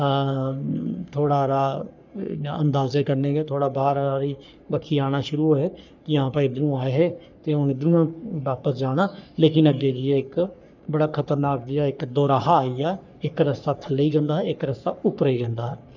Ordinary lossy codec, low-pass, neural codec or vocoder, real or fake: none; 7.2 kHz; codec, 44.1 kHz, 3.4 kbps, Pupu-Codec; fake